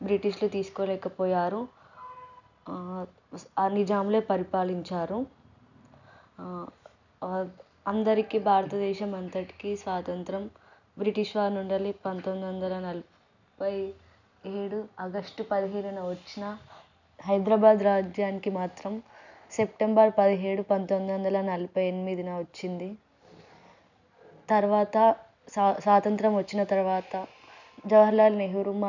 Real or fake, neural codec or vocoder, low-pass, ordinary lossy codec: real; none; 7.2 kHz; none